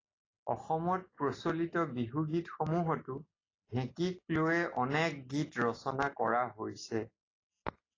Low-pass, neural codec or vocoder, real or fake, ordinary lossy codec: 7.2 kHz; none; real; AAC, 32 kbps